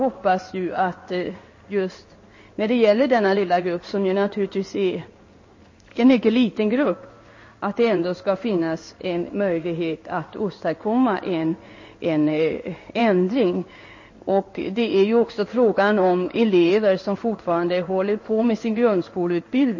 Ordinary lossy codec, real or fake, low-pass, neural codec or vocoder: MP3, 32 kbps; fake; 7.2 kHz; codec, 16 kHz in and 24 kHz out, 1 kbps, XY-Tokenizer